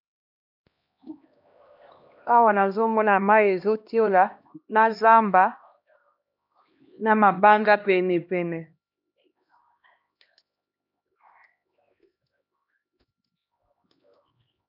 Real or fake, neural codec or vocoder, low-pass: fake; codec, 16 kHz, 1 kbps, X-Codec, HuBERT features, trained on LibriSpeech; 5.4 kHz